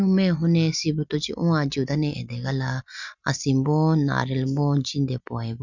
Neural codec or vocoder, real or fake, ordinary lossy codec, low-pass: none; real; none; 7.2 kHz